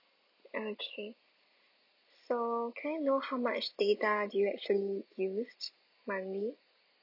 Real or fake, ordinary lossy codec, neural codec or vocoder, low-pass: real; none; none; 5.4 kHz